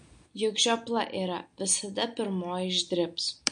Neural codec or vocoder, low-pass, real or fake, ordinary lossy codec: none; 9.9 kHz; real; MP3, 48 kbps